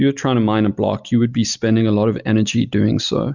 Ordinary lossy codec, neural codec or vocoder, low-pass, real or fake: Opus, 64 kbps; none; 7.2 kHz; real